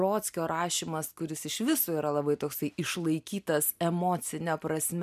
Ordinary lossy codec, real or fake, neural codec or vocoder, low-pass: MP3, 96 kbps; real; none; 14.4 kHz